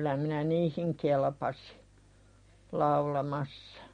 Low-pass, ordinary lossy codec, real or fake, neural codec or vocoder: 9.9 kHz; MP3, 48 kbps; real; none